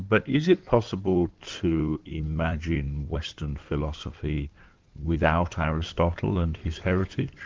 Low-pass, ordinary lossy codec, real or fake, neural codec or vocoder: 7.2 kHz; Opus, 32 kbps; fake; codec, 16 kHz in and 24 kHz out, 2.2 kbps, FireRedTTS-2 codec